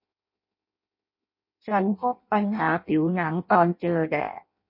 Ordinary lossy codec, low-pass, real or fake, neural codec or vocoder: MP3, 32 kbps; 5.4 kHz; fake; codec, 16 kHz in and 24 kHz out, 0.6 kbps, FireRedTTS-2 codec